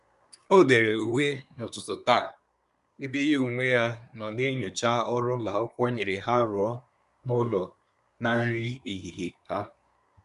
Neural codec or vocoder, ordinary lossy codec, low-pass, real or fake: codec, 24 kHz, 1 kbps, SNAC; none; 10.8 kHz; fake